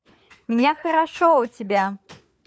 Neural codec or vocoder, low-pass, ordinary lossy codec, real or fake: codec, 16 kHz, 4 kbps, FunCodec, trained on LibriTTS, 50 frames a second; none; none; fake